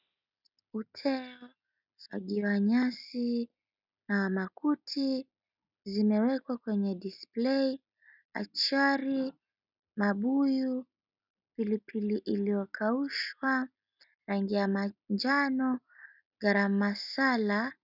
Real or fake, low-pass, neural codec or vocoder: real; 5.4 kHz; none